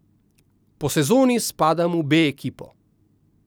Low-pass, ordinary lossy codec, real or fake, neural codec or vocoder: none; none; real; none